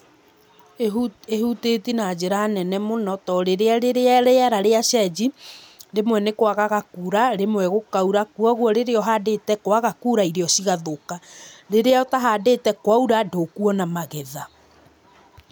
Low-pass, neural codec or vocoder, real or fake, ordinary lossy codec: none; none; real; none